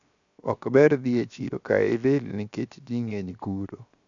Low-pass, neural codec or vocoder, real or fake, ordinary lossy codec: 7.2 kHz; codec, 16 kHz, 0.7 kbps, FocalCodec; fake; none